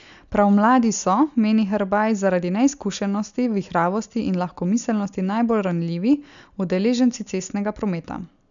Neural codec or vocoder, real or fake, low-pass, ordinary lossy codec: none; real; 7.2 kHz; MP3, 96 kbps